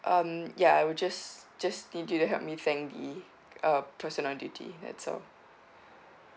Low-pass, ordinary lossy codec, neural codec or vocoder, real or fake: none; none; none; real